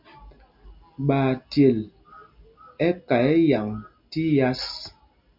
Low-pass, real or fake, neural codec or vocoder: 5.4 kHz; real; none